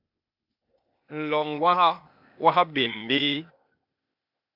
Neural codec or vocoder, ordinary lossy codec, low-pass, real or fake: codec, 16 kHz, 0.8 kbps, ZipCodec; AAC, 48 kbps; 5.4 kHz; fake